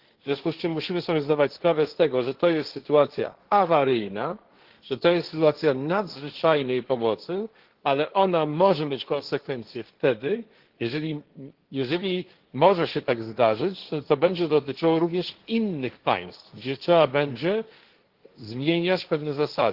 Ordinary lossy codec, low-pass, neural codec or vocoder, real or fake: Opus, 16 kbps; 5.4 kHz; codec, 16 kHz, 1.1 kbps, Voila-Tokenizer; fake